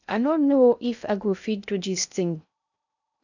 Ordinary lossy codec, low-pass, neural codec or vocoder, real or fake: none; 7.2 kHz; codec, 16 kHz in and 24 kHz out, 0.6 kbps, FocalCodec, streaming, 2048 codes; fake